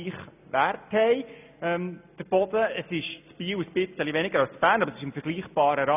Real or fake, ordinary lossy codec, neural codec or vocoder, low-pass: real; none; none; 3.6 kHz